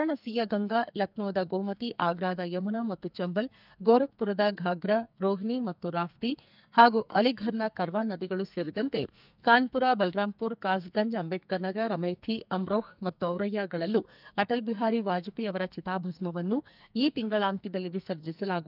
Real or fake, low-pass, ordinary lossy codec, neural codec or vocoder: fake; 5.4 kHz; none; codec, 44.1 kHz, 2.6 kbps, SNAC